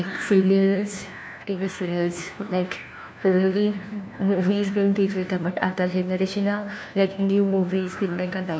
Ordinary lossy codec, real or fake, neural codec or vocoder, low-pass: none; fake; codec, 16 kHz, 1 kbps, FunCodec, trained on LibriTTS, 50 frames a second; none